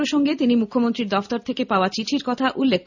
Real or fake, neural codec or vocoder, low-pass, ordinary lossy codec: real; none; 7.2 kHz; none